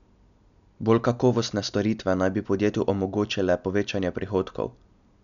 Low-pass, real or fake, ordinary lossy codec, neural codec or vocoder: 7.2 kHz; real; none; none